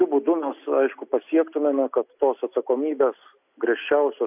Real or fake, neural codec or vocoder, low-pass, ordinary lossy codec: real; none; 3.6 kHz; AAC, 32 kbps